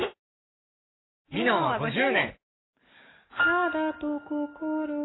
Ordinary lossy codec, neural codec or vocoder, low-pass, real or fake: AAC, 16 kbps; codec, 44.1 kHz, 7.8 kbps, DAC; 7.2 kHz; fake